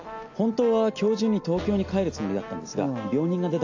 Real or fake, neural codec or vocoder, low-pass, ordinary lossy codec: real; none; 7.2 kHz; AAC, 48 kbps